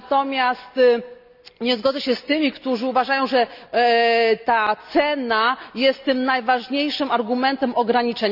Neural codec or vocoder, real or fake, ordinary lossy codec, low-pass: none; real; none; 5.4 kHz